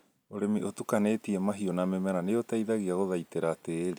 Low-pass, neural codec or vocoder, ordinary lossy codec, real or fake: none; none; none; real